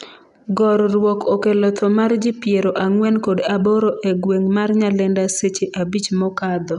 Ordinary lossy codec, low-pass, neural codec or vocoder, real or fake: none; 10.8 kHz; none; real